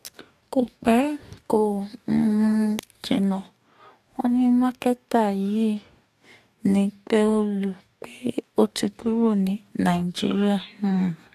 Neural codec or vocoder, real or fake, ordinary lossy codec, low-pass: codec, 44.1 kHz, 2.6 kbps, DAC; fake; AAC, 96 kbps; 14.4 kHz